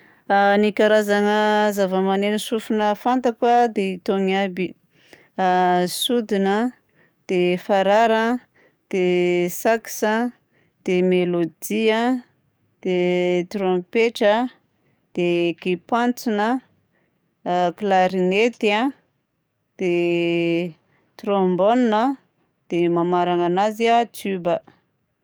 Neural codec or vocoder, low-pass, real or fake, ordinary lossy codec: codec, 44.1 kHz, 7.8 kbps, DAC; none; fake; none